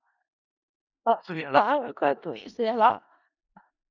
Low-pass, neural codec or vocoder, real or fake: 7.2 kHz; codec, 16 kHz in and 24 kHz out, 0.4 kbps, LongCat-Audio-Codec, four codebook decoder; fake